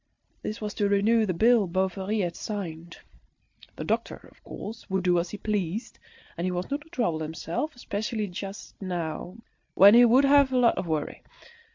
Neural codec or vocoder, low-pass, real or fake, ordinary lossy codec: none; 7.2 kHz; real; MP3, 48 kbps